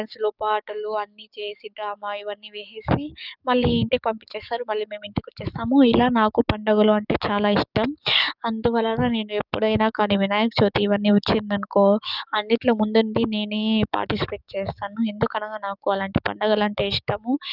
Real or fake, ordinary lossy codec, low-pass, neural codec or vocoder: fake; none; 5.4 kHz; codec, 44.1 kHz, 7.8 kbps, DAC